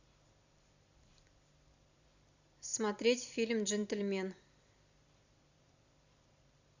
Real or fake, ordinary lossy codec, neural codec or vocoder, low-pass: fake; Opus, 64 kbps; vocoder, 44.1 kHz, 128 mel bands every 256 samples, BigVGAN v2; 7.2 kHz